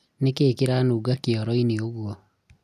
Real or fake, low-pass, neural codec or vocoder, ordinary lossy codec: real; 14.4 kHz; none; none